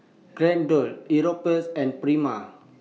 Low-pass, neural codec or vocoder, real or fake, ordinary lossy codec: none; none; real; none